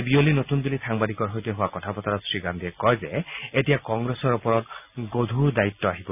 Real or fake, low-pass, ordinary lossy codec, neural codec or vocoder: real; 3.6 kHz; none; none